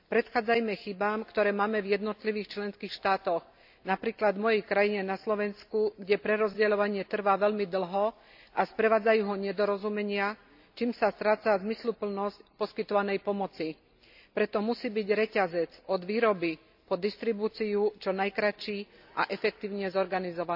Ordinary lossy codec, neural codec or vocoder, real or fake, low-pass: AAC, 48 kbps; none; real; 5.4 kHz